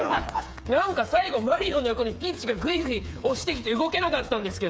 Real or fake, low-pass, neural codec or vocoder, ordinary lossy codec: fake; none; codec, 16 kHz, 4 kbps, FreqCodec, smaller model; none